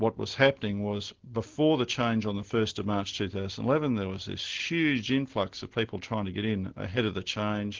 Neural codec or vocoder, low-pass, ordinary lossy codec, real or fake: none; 7.2 kHz; Opus, 16 kbps; real